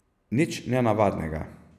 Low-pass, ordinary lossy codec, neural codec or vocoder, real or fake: 14.4 kHz; none; none; real